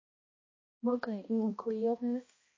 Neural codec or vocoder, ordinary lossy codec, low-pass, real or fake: codec, 16 kHz, 1 kbps, X-Codec, HuBERT features, trained on balanced general audio; MP3, 32 kbps; 7.2 kHz; fake